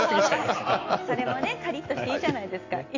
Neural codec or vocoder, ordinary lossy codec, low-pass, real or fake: none; AAC, 48 kbps; 7.2 kHz; real